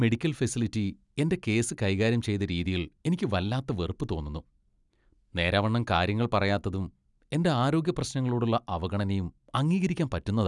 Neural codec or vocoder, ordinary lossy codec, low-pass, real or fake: none; none; 10.8 kHz; real